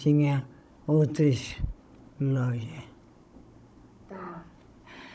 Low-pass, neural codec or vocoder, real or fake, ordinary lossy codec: none; codec, 16 kHz, 16 kbps, FunCodec, trained on Chinese and English, 50 frames a second; fake; none